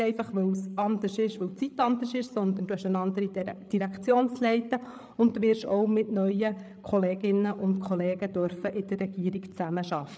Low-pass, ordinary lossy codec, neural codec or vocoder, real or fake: none; none; codec, 16 kHz, 8 kbps, FreqCodec, larger model; fake